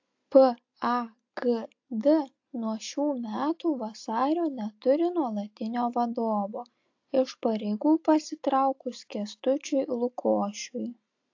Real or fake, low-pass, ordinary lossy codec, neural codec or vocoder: real; 7.2 kHz; AAC, 48 kbps; none